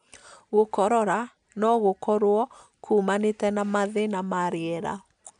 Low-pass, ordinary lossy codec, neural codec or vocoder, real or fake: 9.9 kHz; none; none; real